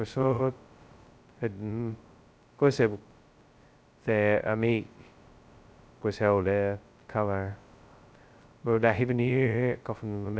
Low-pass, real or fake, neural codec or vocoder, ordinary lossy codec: none; fake; codec, 16 kHz, 0.2 kbps, FocalCodec; none